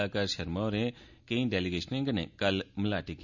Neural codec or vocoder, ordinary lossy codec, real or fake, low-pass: none; none; real; 7.2 kHz